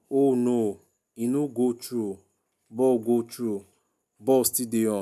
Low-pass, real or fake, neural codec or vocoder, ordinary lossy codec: 14.4 kHz; real; none; none